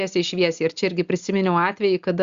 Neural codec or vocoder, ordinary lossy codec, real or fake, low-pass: none; Opus, 64 kbps; real; 7.2 kHz